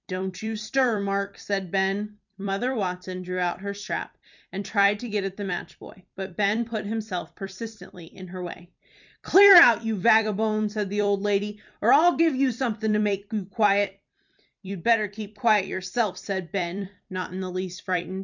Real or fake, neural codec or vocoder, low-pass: fake; vocoder, 44.1 kHz, 128 mel bands every 256 samples, BigVGAN v2; 7.2 kHz